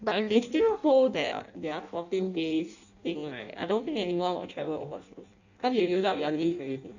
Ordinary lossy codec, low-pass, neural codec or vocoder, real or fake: none; 7.2 kHz; codec, 16 kHz in and 24 kHz out, 0.6 kbps, FireRedTTS-2 codec; fake